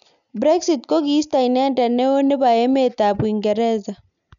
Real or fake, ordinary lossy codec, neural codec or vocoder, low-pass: real; none; none; 7.2 kHz